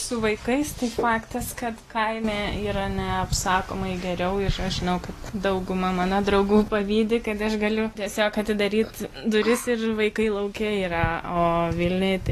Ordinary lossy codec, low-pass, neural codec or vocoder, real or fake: AAC, 48 kbps; 14.4 kHz; none; real